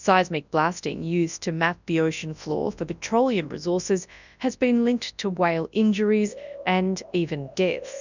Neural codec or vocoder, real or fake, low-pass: codec, 24 kHz, 0.9 kbps, WavTokenizer, large speech release; fake; 7.2 kHz